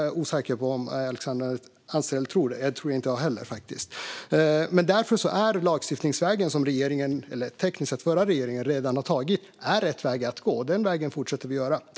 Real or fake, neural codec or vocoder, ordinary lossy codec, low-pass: real; none; none; none